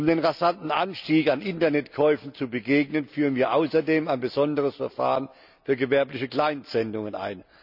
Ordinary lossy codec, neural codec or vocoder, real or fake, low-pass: none; none; real; 5.4 kHz